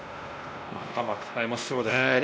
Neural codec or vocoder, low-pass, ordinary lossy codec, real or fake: codec, 16 kHz, 1 kbps, X-Codec, WavLM features, trained on Multilingual LibriSpeech; none; none; fake